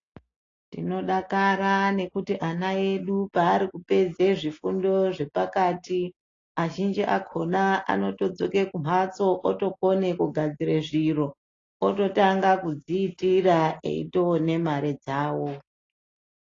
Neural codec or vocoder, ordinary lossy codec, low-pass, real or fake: none; AAC, 32 kbps; 7.2 kHz; real